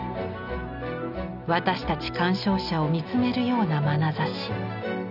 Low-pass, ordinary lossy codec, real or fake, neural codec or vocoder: 5.4 kHz; none; real; none